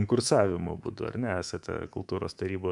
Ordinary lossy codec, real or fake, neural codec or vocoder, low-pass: MP3, 96 kbps; fake; codec, 24 kHz, 3.1 kbps, DualCodec; 10.8 kHz